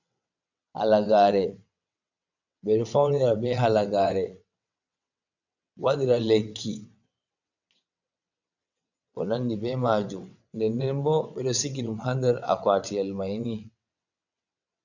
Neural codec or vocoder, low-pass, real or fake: vocoder, 22.05 kHz, 80 mel bands, WaveNeXt; 7.2 kHz; fake